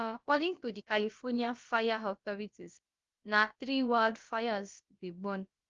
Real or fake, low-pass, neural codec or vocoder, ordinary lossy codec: fake; 7.2 kHz; codec, 16 kHz, about 1 kbps, DyCAST, with the encoder's durations; Opus, 32 kbps